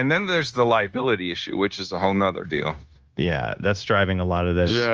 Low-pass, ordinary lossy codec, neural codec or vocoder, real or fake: 7.2 kHz; Opus, 24 kbps; codec, 16 kHz, 0.9 kbps, LongCat-Audio-Codec; fake